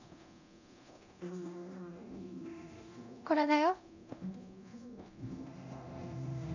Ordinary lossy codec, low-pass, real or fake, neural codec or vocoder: AAC, 48 kbps; 7.2 kHz; fake; codec, 24 kHz, 0.9 kbps, DualCodec